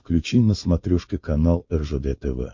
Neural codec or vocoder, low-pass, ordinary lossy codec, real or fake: codec, 16 kHz, 4.8 kbps, FACodec; 7.2 kHz; MP3, 48 kbps; fake